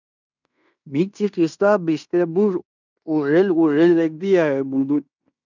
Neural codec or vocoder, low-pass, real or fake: codec, 16 kHz in and 24 kHz out, 0.9 kbps, LongCat-Audio-Codec, fine tuned four codebook decoder; 7.2 kHz; fake